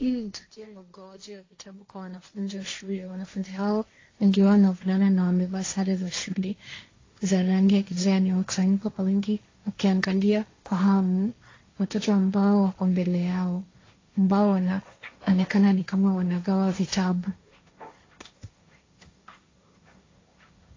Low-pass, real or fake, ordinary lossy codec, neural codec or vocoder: 7.2 kHz; fake; AAC, 32 kbps; codec, 16 kHz, 1.1 kbps, Voila-Tokenizer